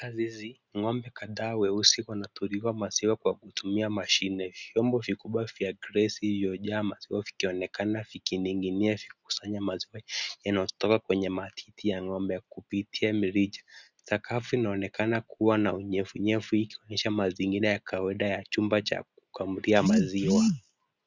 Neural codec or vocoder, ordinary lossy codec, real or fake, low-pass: none; Opus, 64 kbps; real; 7.2 kHz